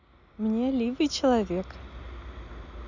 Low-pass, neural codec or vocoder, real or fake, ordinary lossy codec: 7.2 kHz; none; real; none